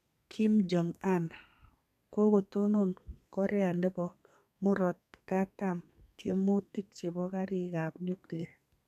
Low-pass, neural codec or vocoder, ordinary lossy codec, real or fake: 14.4 kHz; codec, 32 kHz, 1.9 kbps, SNAC; none; fake